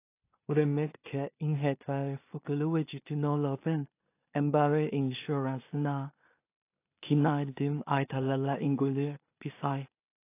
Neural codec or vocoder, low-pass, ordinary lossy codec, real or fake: codec, 16 kHz in and 24 kHz out, 0.4 kbps, LongCat-Audio-Codec, two codebook decoder; 3.6 kHz; AAC, 24 kbps; fake